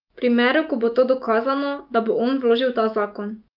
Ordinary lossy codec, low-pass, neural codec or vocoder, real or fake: Opus, 24 kbps; 5.4 kHz; autoencoder, 48 kHz, 128 numbers a frame, DAC-VAE, trained on Japanese speech; fake